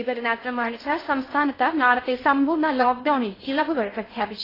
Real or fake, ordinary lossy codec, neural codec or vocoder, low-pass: fake; AAC, 24 kbps; codec, 16 kHz in and 24 kHz out, 0.6 kbps, FocalCodec, streaming, 2048 codes; 5.4 kHz